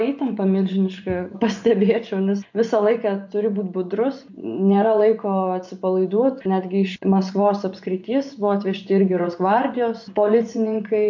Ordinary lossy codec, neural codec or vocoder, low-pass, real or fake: MP3, 48 kbps; vocoder, 24 kHz, 100 mel bands, Vocos; 7.2 kHz; fake